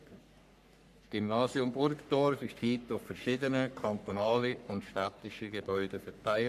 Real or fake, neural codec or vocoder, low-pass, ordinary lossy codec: fake; codec, 44.1 kHz, 3.4 kbps, Pupu-Codec; 14.4 kHz; none